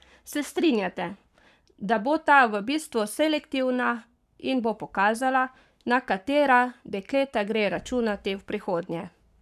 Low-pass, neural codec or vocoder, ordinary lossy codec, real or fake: 14.4 kHz; codec, 44.1 kHz, 7.8 kbps, Pupu-Codec; none; fake